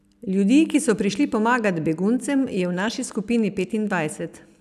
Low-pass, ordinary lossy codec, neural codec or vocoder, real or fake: 14.4 kHz; none; none; real